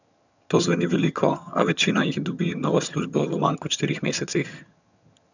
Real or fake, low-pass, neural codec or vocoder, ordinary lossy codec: fake; 7.2 kHz; vocoder, 22.05 kHz, 80 mel bands, HiFi-GAN; none